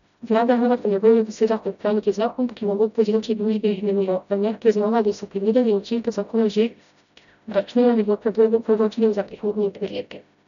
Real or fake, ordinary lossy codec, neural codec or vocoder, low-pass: fake; none; codec, 16 kHz, 0.5 kbps, FreqCodec, smaller model; 7.2 kHz